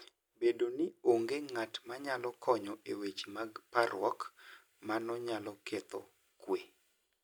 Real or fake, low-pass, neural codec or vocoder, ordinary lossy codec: real; none; none; none